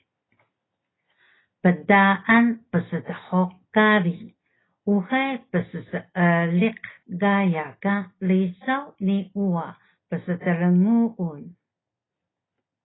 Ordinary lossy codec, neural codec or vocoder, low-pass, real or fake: AAC, 16 kbps; none; 7.2 kHz; real